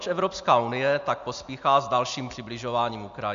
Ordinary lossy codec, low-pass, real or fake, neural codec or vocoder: MP3, 64 kbps; 7.2 kHz; real; none